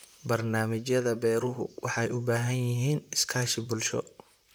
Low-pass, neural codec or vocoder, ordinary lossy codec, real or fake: none; vocoder, 44.1 kHz, 128 mel bands, Pupu-Vocoder; none; fake